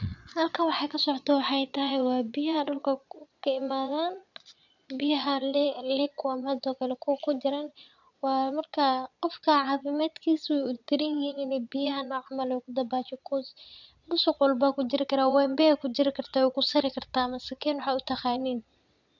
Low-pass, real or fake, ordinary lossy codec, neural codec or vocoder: 7.2 kHz; fake; none; vocoder, 22.05 kHz, 80 mel bands, Vocos